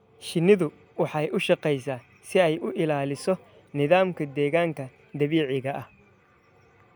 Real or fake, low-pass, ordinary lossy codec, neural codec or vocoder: real; none; none; none